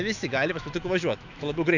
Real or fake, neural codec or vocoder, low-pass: fake; autoencoder, 48 kHz, 128 numbers a frame, DAC-VAE, trained on Japanese speech; 7.2 kHz